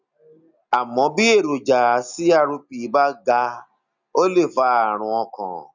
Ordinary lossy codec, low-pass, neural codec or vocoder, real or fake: none; 7.2 kHz; none; real